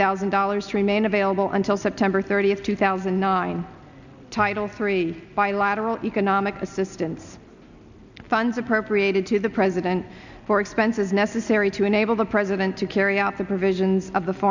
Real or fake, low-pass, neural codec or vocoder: real; 7.2 kHz; none